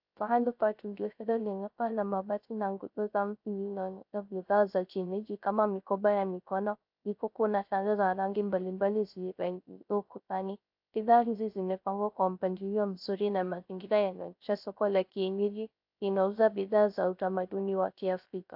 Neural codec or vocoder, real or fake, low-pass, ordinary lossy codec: codec, 16 kHz, 0.3 kbps, FocalCodec; fake; 5.4 kHz; AAC, 48 kbps